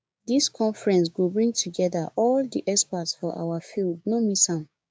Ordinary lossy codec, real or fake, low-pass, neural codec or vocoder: none; fake; none; codec, 16 kHz, 6 kbps, DAC